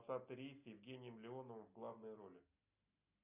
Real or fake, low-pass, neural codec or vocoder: real; 3.6 kHz; none